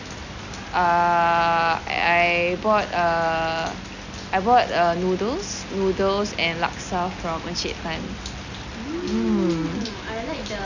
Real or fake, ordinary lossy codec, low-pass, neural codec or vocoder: real; none; 7.2 kHz; none